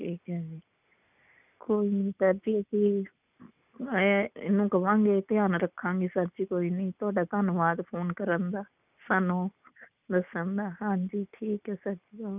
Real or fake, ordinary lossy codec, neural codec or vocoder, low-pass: real; none; none; 3.6 kHz